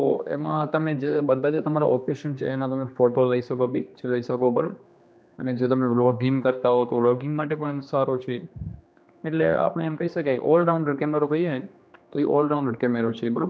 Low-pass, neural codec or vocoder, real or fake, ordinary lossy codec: none; codec, 16 kHz, 2 kbps, X-Codec, HuBERT features, trained on general audio; fake; none